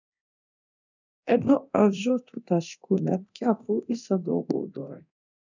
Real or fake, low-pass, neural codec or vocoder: fake; 7.2 kHz; codec, 24 kHz, 0.9 kbps, DualCodec